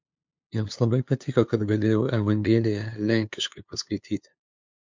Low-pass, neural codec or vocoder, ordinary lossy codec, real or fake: 7.2 kHz; codec, 16 kHz, 2 kbps, FunCodec, trained on LibriTTS, 25 frames a second; MP3, 64 kbps; fake